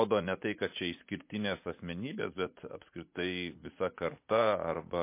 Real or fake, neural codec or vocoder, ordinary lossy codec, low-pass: fake; vocoder, 44.1 kHz, 80 mel bands, Vocos; MP3, 24 kbps; 3.6 kHz